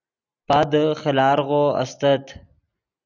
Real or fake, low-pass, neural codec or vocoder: real; 7.2 kHz; none